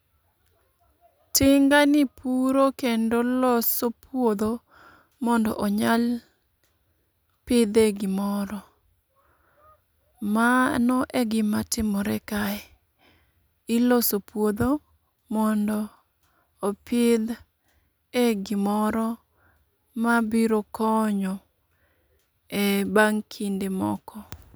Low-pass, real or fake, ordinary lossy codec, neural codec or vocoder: none; real; none; none